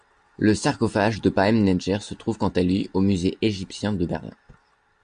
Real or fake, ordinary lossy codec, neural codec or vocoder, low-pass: real; AAC, 96 kbps; none; 9.9 kHz